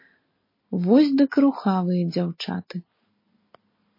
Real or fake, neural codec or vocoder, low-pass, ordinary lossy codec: real; none; 5.4 kHz; MP3, 24 kbps